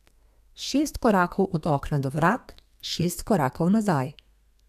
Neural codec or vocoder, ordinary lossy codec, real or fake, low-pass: codec, 32 kHz, 1.9 kbps, SNAC; none; fake; 14.4 kHz